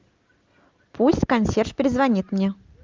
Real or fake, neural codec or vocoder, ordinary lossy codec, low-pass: real; none; Opus, 32 kbps; 7.2 kHz